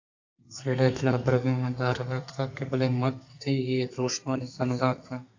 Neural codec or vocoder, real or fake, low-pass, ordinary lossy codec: codec, 32 kHz, 1.9 kbps, SNAC; fake; 7.2 kHz; AAC, 48 kbps